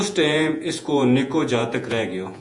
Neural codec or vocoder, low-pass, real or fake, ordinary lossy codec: vocoder, 48 kHz, 128 mel bands, Vocos; 10.8 kHz; fake; MP3, 64 kbps